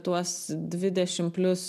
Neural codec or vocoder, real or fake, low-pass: none; real; 14.4 kHz